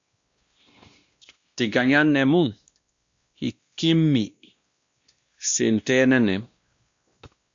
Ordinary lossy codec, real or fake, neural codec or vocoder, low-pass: Opus, 64 kbps; fake; codec, 16 kHz, 1 kbps, X-Codec, WavLM features, trained on Multilingual LibriSpeech; 7.2 kHz